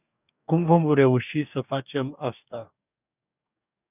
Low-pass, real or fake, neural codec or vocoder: 3.6 kHz; fake; codec, 44.1 kHz, 2.6 kbps, DAC